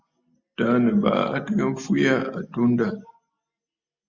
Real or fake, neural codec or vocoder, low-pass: real; none; 7.2 kHz